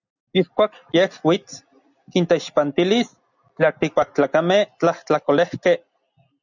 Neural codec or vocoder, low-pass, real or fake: none; 7.2 kHz; real